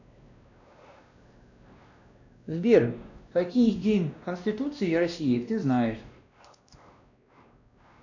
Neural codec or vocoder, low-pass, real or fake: codec, 16 kHz, 1 kbps, X-Codec, WavLM features, trained on Multilingual LibriSpeech; 7.2 kHz; fake